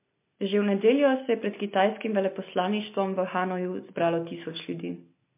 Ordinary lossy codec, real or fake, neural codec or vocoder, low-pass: MP3, 24 kbps; real; none; 3.6 kHz